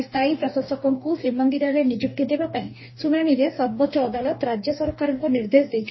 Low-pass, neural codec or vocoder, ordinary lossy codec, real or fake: 7.2 kHz; codec, 44.1 kHz, 2.6 kbps, DAC; MP3, 24 kbps; fake